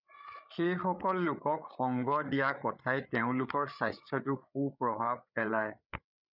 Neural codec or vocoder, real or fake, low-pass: codec, 16 kHz, 8 kbps, FreqCodec, larger model; fake; 5.4 kHz